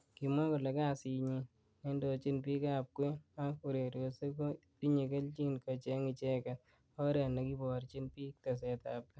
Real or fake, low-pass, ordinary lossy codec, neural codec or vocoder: real; none; none; none